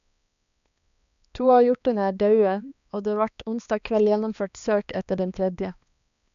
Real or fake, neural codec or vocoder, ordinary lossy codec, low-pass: fake; codec, 16 kHz, 2 kbps, X-Codec, HuBERT features, trained on balanced general audio; none; 7.2 kHz